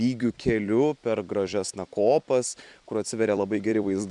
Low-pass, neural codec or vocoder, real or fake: 10.8 kHz; vocoder, 44.1 kHz, 128 mel bands every 256 samples, BigVGAN v2; fake